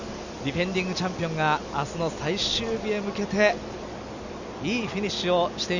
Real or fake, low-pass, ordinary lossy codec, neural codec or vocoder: real; 7.2 kHz; none; none